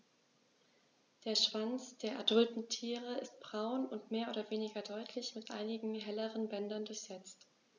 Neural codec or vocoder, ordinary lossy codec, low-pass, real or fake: none; none; 7.2 kHz; real